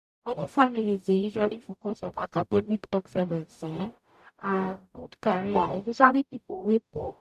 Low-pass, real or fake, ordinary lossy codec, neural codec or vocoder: 14.4 kHz; fake; none; codec, 44.1 kHz, 0.9 kbps, DAC